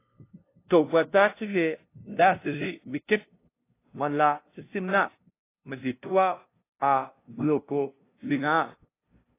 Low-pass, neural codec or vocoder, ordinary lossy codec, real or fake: 3.6 kHz; codec, 16 kHz, 0.5 kbps, FunCodec, trained on LibriTTS, 25 frames a second; AAC, 24 kbps; fake